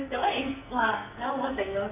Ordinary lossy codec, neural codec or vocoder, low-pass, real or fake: none; codec, 44.1 kHz, 2.6 kbps, SNAC; 3.6 kHz; fake